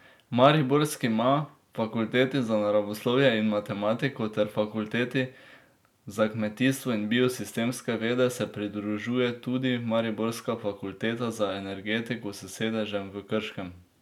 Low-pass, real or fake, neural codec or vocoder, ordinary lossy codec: 19.8 kHz; real; none; none